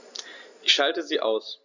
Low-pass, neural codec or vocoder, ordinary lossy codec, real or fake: 7.2 kHz; none; none; real